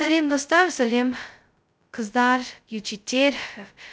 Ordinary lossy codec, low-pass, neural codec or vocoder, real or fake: none; none; codec, 16 kHz, 0.2 kbps, FocalCodec; fake